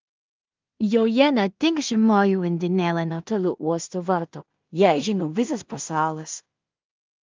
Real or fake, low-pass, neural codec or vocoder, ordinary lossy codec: fake; 7.2 kHz; codec, 16 kHz in and 24 kHz out, 0.4 kbps, LongCat-Audio-Codec, two codebook decoder; Opus, 24 kbps